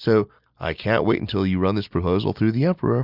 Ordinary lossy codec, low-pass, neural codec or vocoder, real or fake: Opus, 64 kbps; 5.4 kHz; none; real